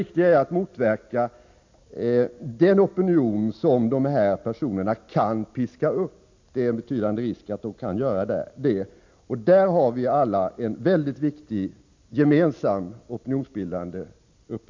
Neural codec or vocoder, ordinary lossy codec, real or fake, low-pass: none; MP3, 48 kbps; real; 7.2 kHz